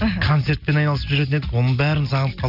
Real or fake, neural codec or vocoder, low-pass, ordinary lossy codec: real; none; 5.4 kHz; none